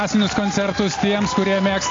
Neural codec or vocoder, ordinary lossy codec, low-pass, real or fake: none; AAC, 32 kbps; 7.2 kHz; real